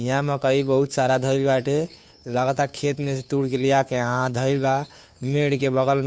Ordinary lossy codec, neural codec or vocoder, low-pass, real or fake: none; codec, 16 kHz, 2 kbps, FunCodec, trained on Chinese and English, 25 frames a second; none; fake